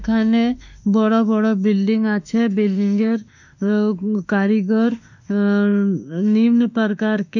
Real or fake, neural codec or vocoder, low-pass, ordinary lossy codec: fake; autoencoder, 48 kHz, 32 numbers a frame, DAC-VAE, trained on Japanese speech; 7.2 kHz; none